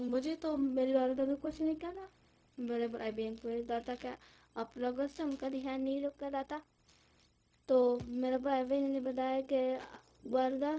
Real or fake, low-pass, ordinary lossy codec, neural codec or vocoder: fake; none; none; codec, 16 kHz, 0.4 kbps, LongCat-Audio-Codec